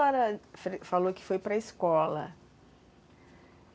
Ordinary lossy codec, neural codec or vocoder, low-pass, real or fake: none; none; none; real